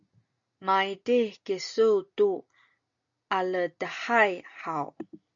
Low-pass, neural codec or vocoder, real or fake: 7.2 kHz; none; real